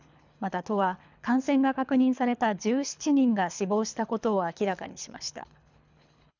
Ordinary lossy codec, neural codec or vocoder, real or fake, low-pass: none; codec, 24 kHz, 3 kbps, HILCodec; fake; 7.2 kHz